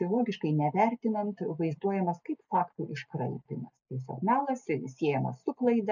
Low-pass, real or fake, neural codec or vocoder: 7.2 kHz; real; none